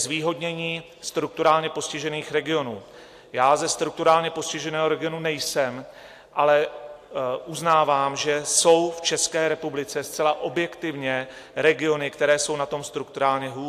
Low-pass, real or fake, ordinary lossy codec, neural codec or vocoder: 14.4 kHz; real; AAC, 64 kbps; none